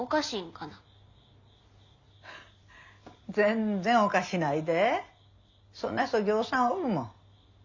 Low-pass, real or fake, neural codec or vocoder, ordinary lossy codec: 7.2 kHz; real; none; Opus, 64 kbps